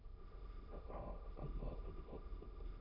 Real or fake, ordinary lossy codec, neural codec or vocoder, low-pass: fake; none; autoencoder, 22.05 kHz, a latent of 192 numbers a frame, VITS, trained on many speakers; 5.4 kHz